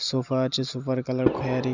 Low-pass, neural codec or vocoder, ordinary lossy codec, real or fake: 7.2 kHz; none; none; real